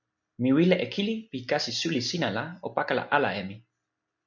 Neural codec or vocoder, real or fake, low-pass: none; real; 7.2 kHz